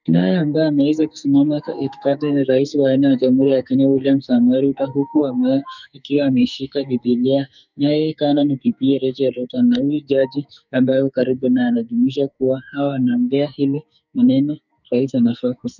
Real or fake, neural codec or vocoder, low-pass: fake; codec, 44.1 kHz, 2.6 kbps, SNAC; 7.2 kHz